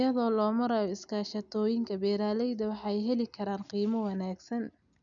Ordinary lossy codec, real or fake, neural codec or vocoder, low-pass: none; real; none; 7.2 kHz